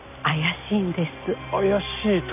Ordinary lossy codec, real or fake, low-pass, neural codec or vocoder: none; real; 3.6 kHz; none